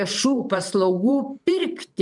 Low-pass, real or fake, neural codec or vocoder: 10.8 kHz; fake; vocoder, 44.1 kHz, 128 mel bands every 512 samples, BigVGAN v2